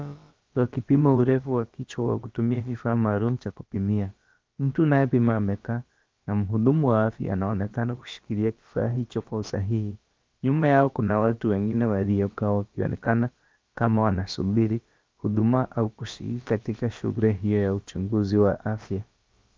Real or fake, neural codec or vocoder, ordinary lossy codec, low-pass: fake; codec, 16 kHz, about 1 kbps, DyCAST, with the encoder's durations; Opus, 24 kbps; 7.2 kHz